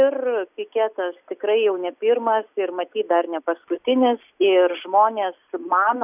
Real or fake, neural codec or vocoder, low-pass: real; none; 3.6 kHz